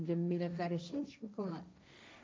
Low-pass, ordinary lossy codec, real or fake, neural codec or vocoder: none; none; fake; codec, 16 kHz, 1.1 kbps, Voila-Tokenizer